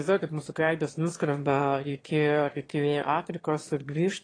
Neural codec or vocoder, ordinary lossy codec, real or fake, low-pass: autoencoder, 22.05 kHz, a latent of 192 numbers a frame, VITS, trained on one speaker; AAC, 32 kbps; fake; 9.9 kHz